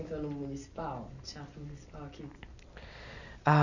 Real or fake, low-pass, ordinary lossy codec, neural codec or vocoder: real; 7.2 kHz; none; none